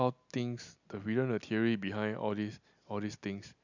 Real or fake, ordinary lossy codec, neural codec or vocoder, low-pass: real; none; none; 7.2 kHz